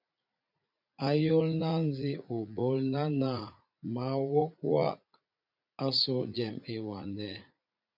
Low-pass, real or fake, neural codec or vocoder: 5.4 kHz; fake; vocoder, 44.1 kHz, 80 mel bands, Vocos